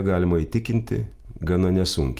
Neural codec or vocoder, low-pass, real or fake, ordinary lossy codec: none; 14.4 kHz; real; Opus, 32 kbps